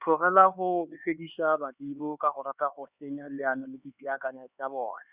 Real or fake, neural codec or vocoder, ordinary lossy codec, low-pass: fake; codec, 16 kHz, 4 kbps, X-Codec, HuBERT features, trained on LibriSpeech; Opus, 64 kbps; 3.6 kHz